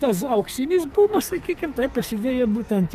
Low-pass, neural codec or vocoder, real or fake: 14.4 kHz; codec, 44.1 kHz, 2.6 kbps, SNAC; fake